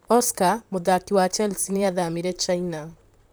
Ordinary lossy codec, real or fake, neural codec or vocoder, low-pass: none; fake; vocoder, 44.1 kHz, 128 mel bands, Pupu-Vocoder; none